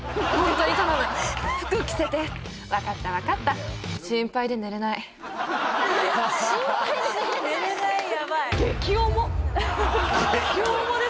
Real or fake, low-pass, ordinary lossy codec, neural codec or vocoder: real; none; none; none